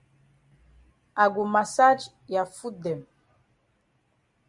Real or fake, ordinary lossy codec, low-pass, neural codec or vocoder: real; Opus, 64 kbps; 10.8 kHz; none